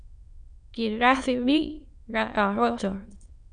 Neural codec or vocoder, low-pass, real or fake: autoencoder, 22.05 kHz, a latent of 192 numbers a frame, VITS, trained on many speakers; 9.9 kHz; fake